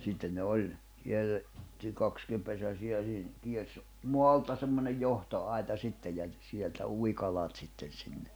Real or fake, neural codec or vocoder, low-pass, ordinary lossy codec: real; none; none; none